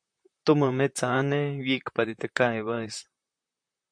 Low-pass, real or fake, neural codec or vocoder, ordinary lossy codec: 9.9 kHz; fake; vocoder, 44.1 kHz, 128 mel bands, Pupu-Vocoder; MP3, 64 kbps